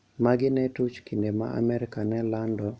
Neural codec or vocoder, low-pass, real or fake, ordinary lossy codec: none; none; real; none